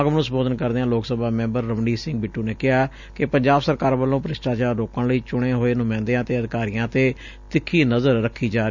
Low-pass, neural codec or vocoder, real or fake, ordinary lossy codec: 7.2 kHz; none; real; none